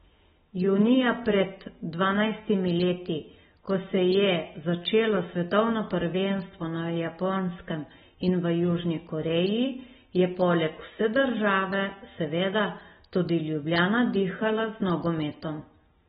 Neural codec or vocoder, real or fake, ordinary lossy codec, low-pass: none; real; AAC, 16 kbps; 14.4 kHz